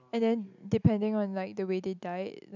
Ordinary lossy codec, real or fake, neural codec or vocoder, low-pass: none; real; none; 7.2 kHz